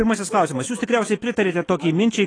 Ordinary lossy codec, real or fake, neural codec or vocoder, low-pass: AAC, 32 kbps; fake; autoencoder, 48 kHz, 128 numbers a frame, DAC-VAE, trained on Japanese speech; 9.9 kHz